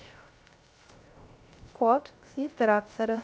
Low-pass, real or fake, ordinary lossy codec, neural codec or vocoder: none; fake; none; codec, 16 kHz, 0.3 kbps, FocalCodec